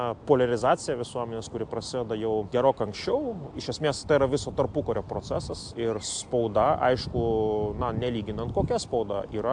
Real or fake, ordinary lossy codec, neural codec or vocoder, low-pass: real; AAC, 64 kbps; none; 9.9 kHz